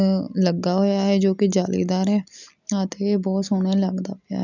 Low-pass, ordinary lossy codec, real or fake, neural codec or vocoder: 7.2 kHz; none; real; none